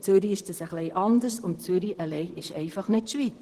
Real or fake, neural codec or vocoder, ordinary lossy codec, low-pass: fake; vocoder, 44.1 kHz, 128 mel bands, Pupu-Vocoder; Opus, 16 kbps; 14.4 kHz